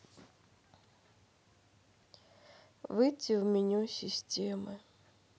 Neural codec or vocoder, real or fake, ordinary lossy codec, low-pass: none; real; none; none